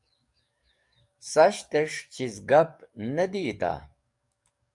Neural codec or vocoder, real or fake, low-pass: codec, 44.1 kHz, 7.8 kbps, DAC; fake; 10.8 kHz